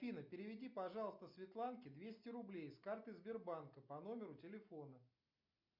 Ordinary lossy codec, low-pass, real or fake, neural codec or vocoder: MP3, 48 kbps; 5.4 kHz; real; none